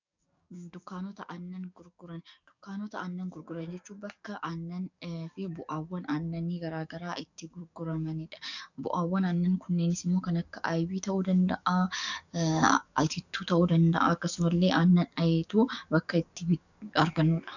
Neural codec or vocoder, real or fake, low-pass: codec, 44.1 kHz, 7.8 kbps, DAC; fake; 7.2 kHz